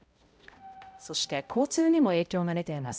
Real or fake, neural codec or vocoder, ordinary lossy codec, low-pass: fake; codec, 16 kHz, 0.5 kbps, X-Codec, HuBERT features, trained on balanced general audio; none; none